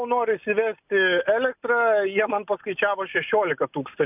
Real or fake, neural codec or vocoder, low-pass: real; none; 3.6 kHz